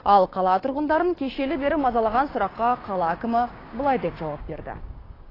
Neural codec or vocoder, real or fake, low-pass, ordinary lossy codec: codec, 16 kHz, 6 kbps, DAC; fake; 5.4 kHz; AAC, 24 kbps